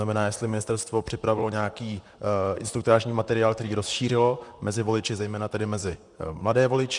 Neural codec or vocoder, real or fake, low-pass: vocoder, 44.1 kHz, 128 mel bands, Pupu-Vocoder; fake; 10.8 kHz